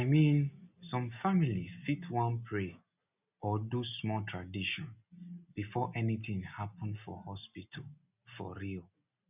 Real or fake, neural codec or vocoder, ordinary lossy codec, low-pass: real; none; none; 3.6 kHz